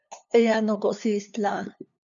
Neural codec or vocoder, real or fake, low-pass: codec, 16 kHz, 2 kbps, FunCodec, trained on LibriTTS, 25 frames a second; fake; 7.2 kHz